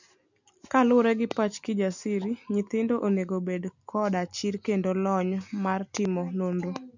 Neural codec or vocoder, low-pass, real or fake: none; 7.2 kHz; real